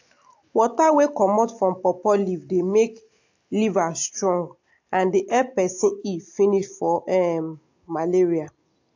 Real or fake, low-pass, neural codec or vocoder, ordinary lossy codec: real; 7.2 kHz; none; AAC, 48 kbps